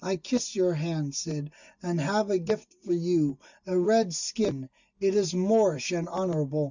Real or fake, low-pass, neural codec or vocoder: real; 7.2 kHz; none